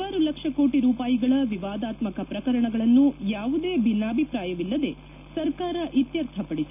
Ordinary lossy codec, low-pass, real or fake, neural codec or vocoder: none; 3.6 kHz; real; none